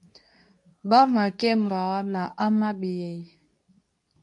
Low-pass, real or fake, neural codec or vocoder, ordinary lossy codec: 10.8 kHz; fake; codec, 24 kHz, 0.9 kbps, WavTokenizer, medium speech release version 2; AAC, 64 kbps